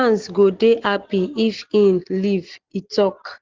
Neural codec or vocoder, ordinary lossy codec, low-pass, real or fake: none; Opus, 16 kbps; 7.2 kHz; real